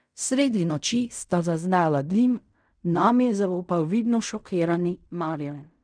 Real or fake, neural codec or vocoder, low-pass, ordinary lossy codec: fake; codec, 16 kHz in and 24 kHz out, 0.4 kbps, LongCat-Audio-Codec, fine tuned four codebook decoder; 9.9 kHz; none